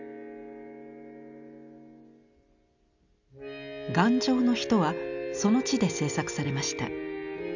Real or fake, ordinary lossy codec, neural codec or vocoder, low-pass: real; none; none; 7.2 kHz